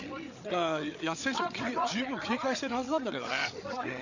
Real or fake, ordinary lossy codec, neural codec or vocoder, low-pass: fake; none; codec, 16 kHz, 8 kbps, FreqCodec, larger model; 7.2 kHz